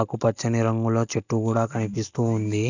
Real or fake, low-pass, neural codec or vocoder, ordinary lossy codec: real; 7.2 kHz; none; none